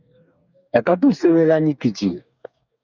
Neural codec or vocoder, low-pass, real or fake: codec, 44.1 kHz, 2.6 kbps, DAC; 7.2 kHz; fake